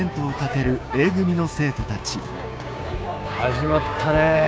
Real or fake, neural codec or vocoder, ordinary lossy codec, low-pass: fake; codec, 16 kHz, 6 kbps, DAC; none; none